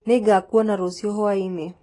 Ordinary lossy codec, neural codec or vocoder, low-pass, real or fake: AAC, 32 kbps; none; 10.8 kHz; real